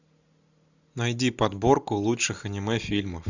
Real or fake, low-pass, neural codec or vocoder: real; 7.2 kHz; none